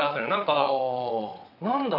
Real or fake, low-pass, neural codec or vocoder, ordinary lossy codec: fake; 5.4 kHz; vocoder, 22.05 kHz, 80 mel bands, WaveNeXt; none